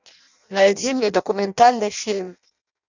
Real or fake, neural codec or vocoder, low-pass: fake; codec, 16 kHz in and 24 kHz out, 0.6 kbps, FireRedTTS-2 codec; 7.2 kHz